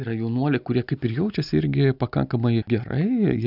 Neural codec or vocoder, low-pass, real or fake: none; 5.4 kHz; real